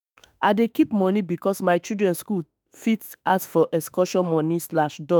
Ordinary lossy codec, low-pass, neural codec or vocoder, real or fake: none; none; autoencoder, 48 kHz, 32 numbers a frame, DAC-VAE, trained on Japanese speech; fake